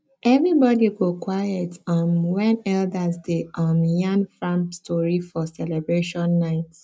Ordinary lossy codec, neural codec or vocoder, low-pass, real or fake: none; none; none; real